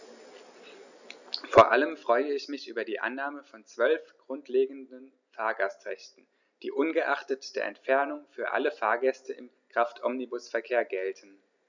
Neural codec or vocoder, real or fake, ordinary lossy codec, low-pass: none; real; none; 7.2 kHz